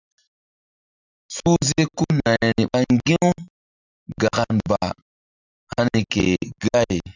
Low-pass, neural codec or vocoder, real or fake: 7.2 kHz; none; real